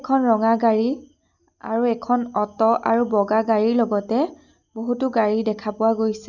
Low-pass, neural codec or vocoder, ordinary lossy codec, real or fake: 7.2 kHz; none; none; real